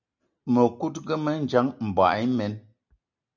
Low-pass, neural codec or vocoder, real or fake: 7.2 kHz; none; real